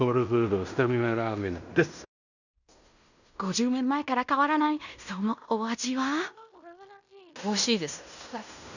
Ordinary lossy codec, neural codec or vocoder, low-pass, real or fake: none; codec, 16 kHz in and 24 kHz out, 0.9 kbps, LongCat-Audio-Codec, fine tuned four codebook decoder; 7.2 kHz; fake